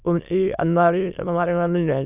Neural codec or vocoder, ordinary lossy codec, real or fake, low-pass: autoencoder, 22.05 kHz, a latent of 192 numbers a frame, VITS, trained on many speakers; none; fake; 3.6 kHz